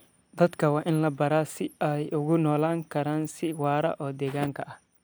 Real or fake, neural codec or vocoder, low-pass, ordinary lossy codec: real; none; none; none